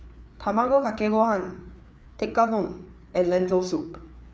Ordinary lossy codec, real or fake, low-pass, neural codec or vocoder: none; fake; none; codec, 16 kHz, 4 kbps, FreqCodec, larger model